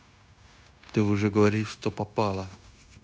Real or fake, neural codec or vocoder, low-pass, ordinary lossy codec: fake; codec, 16 kHz, 0.9 kbps, LongCat-Audio-Codec; none; none